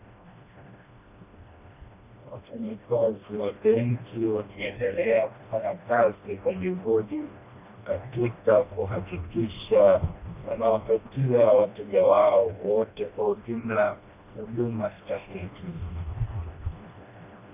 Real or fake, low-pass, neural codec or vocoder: fake; 3.6 kHz; codec, 16 kHz, 1 kbps, FreqCodec, smaller model